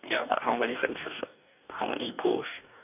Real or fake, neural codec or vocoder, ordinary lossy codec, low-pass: fake; codec, 44.1 kHz, 2.6 kbps, DAC; none; 3.6 kHz